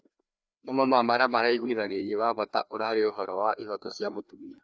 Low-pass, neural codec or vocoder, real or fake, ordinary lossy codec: none; codec, 16 kHz, 2 kbps, FreqCodec, larger model; fake; none